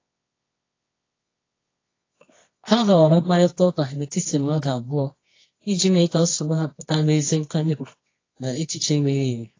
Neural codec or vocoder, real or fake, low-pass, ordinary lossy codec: codec, 24 kHz, 0.9 kbps, WavTokenizer, medium music audio release; fake; 7.2 kHz; AAC, 32 kbps